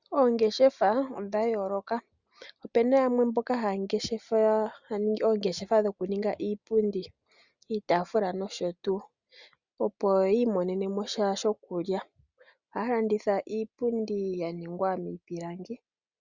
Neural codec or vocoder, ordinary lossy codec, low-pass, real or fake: none; Opus, 64 kbps; 7.2 kHz; real